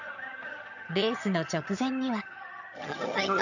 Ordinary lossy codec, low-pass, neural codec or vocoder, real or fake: none; 7.2 kHz; vocoder, 22.05 kHz, 80 mel bands, HiFi-GAN; fake